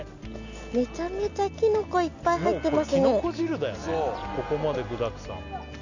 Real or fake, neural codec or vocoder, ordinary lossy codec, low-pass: real; none; none; 7.2 kHz